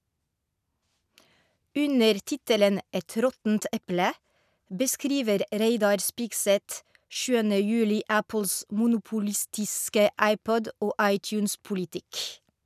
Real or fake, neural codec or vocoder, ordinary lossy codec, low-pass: real; none; none; 14.4 kHz